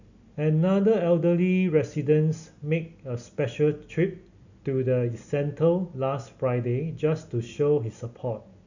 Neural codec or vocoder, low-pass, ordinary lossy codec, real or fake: none; 7.2 kHz; none; real